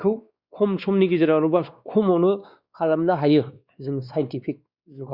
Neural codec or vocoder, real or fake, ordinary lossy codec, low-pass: codec, 16 kHz, 2 kbps, X-Codec, WavLM features, trained on Multilingual LibriSpeech; fake; Opus, 64 kbps; 5.4 kHz